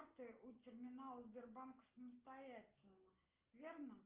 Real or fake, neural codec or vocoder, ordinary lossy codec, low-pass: real; none; Opus, 32 kbps; 3.6 kHz